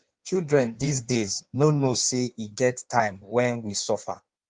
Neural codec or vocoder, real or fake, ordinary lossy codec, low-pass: codec, 16 kHz in and 24 kHz out, 1.1 kbps, FireRedTTS-2 codec; fake; Opus, 32 kbps; 9.9 kHz